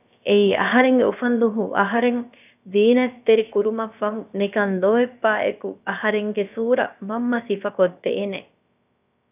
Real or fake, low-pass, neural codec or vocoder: fake; 3.6 kHz; codec, 16 kHz, about 1 kbps, DyCAST, with the encoder's durations